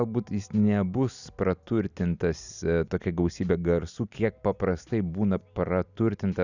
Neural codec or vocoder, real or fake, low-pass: none; real; 7.2 kHz